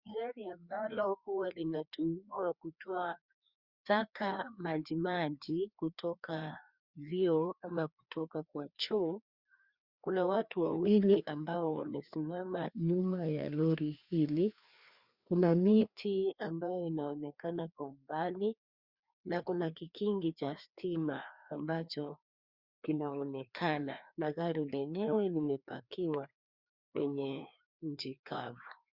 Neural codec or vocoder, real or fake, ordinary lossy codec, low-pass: codec, 16 kHz, 2 kbps, FreqCodec, larger model; fake; Opus, 64 kbps; 5.4 kHz